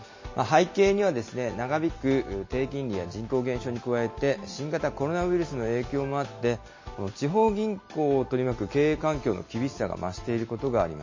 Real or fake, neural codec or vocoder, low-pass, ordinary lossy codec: real; none; 7.2 kHz; MP3, 32 kbps